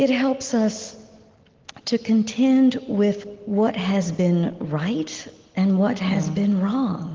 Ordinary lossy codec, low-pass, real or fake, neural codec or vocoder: Opus, 16 kbps; 7.2 kHz; real; none